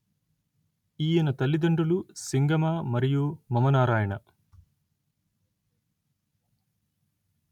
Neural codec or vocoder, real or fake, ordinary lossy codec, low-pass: none; real; none; 19.8 kHz